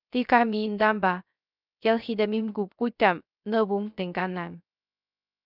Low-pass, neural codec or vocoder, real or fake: 5.4 kHz; codec, 16 kHz, 0.3 kbps, FocalCodec; fake